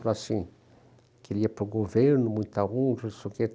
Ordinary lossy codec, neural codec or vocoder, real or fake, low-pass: none; none; real; none